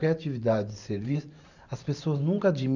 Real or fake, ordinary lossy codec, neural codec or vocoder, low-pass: fake; none; vocoder, 44.1 kHz, 128 mel bands every 512 samples, BigVGAN v2; 7.2 kHz